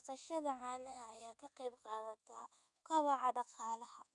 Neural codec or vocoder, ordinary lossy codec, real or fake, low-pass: codec, 24 kHz, 1.2 kbps, DualCodec; none; fake; 10.8 kHz